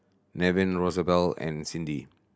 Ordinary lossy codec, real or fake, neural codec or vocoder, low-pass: none; real; none; none